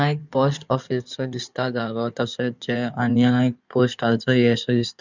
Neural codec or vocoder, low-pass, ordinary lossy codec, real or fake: codec, 16 kHz in and 24 kHz out, 2.2 kbps, FireRedTTS-2 codec; 7.2 kHz; none; fake